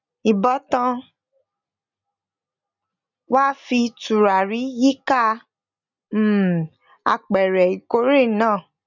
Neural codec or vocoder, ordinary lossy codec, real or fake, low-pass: none; none; real; 7.2 kHz